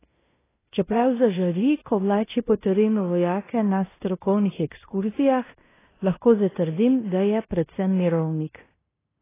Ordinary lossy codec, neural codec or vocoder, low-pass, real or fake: AAC, 16 kbps; codec, 16 kHz in and 24 kHz out, 0.9 kbps, LongCat-Audio-Codec, fine tuned four codebook decoder; 3.6 kHz; fake